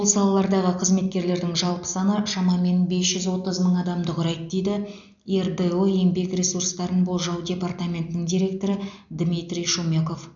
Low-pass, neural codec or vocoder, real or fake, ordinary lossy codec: 7.2 kHz; none; real; none